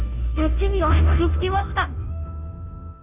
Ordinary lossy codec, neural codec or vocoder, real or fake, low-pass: none; codec, 16 kHz, 0.5 kbps, FunCodec, trained on Chinese and English, 25 frames a second; fake; 3.6 kHz